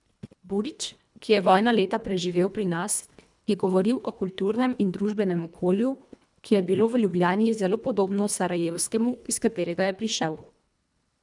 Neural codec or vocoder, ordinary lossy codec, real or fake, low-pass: codec, 24 kHz, 1.5 kbps, HILCodec; none; fake; 10.8 kHz